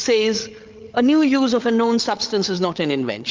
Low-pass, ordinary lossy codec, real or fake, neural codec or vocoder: 7.2 kHz; Opus, 32 kbps; fake; codec, 16 kHz, 8 kbps, FreqCodec, larger model